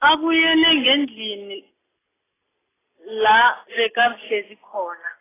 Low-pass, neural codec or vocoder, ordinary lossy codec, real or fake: 3.6 kHz; none; AAC, 16 kbps; real